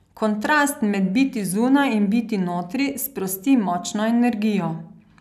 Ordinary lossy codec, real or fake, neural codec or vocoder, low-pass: none; real; none; 14.4 kHz